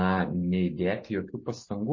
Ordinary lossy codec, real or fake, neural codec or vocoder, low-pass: MP3, 32 kbps; real; none; 7.2 kHz